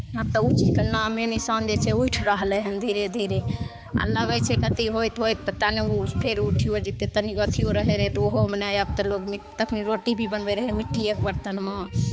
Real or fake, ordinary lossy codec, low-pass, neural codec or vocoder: fake; none; none; codec, 16 kHz, 4 kbps, X-Codec, HuBERT features, trained on balanced general audio